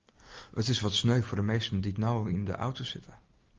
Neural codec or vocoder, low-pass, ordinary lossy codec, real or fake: none; 7.2 kHz; Opus, 32 kbps; real